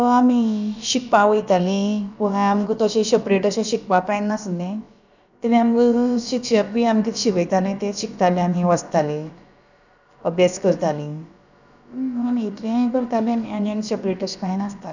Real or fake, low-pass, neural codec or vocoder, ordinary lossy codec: fake; 7.2 kHz; codec, 16 kHz, about 1 kbps, DyCAST, with the encoder's durations; none